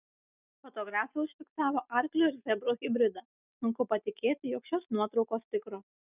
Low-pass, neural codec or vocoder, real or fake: 3.6 kHz; none; real